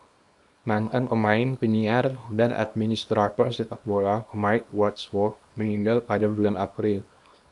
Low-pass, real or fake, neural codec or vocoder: 10.8 kHz; fake; codec, 24 kHz, 0.9 kbps, WavTokenizer, small release